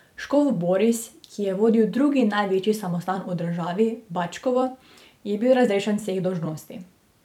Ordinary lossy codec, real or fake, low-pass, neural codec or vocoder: none; fake; 19.8 kHz; vocoder, 44.1 kHz, 128 mel bands every 256 samples, BigVGAN v2